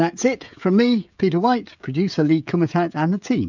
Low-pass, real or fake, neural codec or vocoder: 7.2 kHz; fake; codec, 16 kHz, 16 kbps, FreqCodec, smaller model